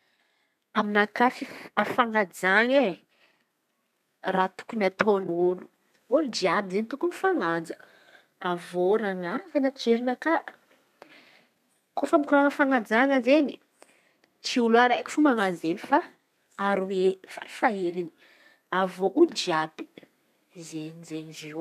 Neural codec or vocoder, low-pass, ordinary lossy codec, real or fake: codec, 32 kHz, 1.9 kbps, SNAC; 14.4 kHz; none; fake